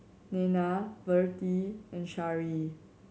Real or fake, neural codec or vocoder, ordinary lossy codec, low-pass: real; none; none; none